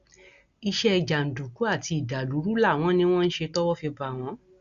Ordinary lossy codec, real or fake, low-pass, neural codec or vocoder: Opus, 64 kbps; real; 7.2 kHz; none